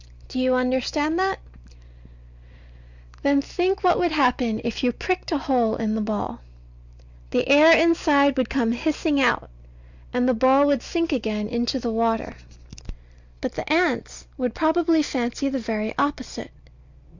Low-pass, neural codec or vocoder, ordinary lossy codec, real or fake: 7.2 kHz; none; Opus, 64 kbps; real